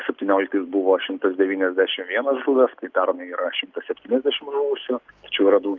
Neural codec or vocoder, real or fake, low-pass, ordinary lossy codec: none; real; 7.2 kHz; Opus, 24 kbps